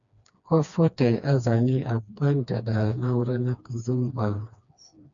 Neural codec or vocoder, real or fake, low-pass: codec, 16 kHz, 2 kbps, FreqCodec, smaller model; fake; 7.2 kHz